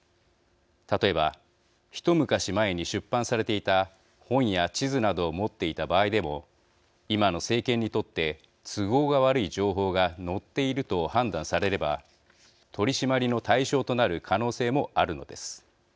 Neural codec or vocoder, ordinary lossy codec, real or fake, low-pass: none; none; real; none